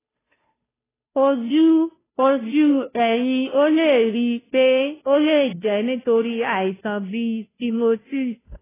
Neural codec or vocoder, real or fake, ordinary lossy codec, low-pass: codec, 16 kHz, 0.5 kbps, FunCodec, trained on Chinese and English, 25 frames a second; fake; AAC, 16 kbps; 3.6 kHz